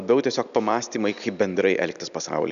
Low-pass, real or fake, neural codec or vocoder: 7.2 kHz; real; none